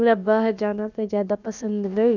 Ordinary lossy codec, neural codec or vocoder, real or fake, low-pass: none; codec, 16 kHz, about 1 kbps, DyCAST, with the encoder's durations; fake; 7.2 kHz